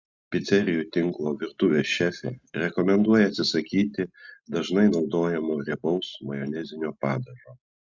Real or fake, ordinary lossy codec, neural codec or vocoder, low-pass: real; Opus, 64 kbps; none; 7.2 kHz